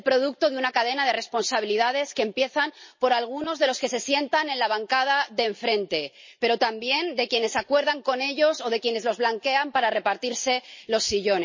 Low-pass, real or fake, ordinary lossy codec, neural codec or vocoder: 7.2 kHz; real; MP3, 32 kbps; none